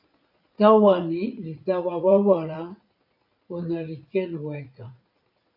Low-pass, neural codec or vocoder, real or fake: 5.4 kHz; vocoder, 44.1 kHz, 80 mel bands, Vocos; fake